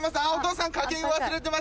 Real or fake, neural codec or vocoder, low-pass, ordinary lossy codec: real; none; none; none